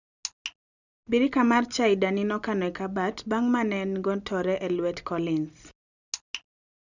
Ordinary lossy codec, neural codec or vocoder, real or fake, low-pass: none; none; real; 7.2 kHz